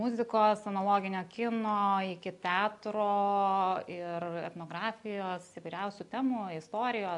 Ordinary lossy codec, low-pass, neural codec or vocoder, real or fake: AAC, 64 kbps; 10.8 kHz; none; real